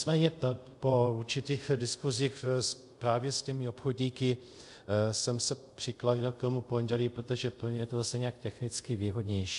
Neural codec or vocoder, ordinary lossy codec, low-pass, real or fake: codec, 24 kHz, 0.5 kbps, DualCodec; MP3, 64 kbps; 10.8 kHz; fake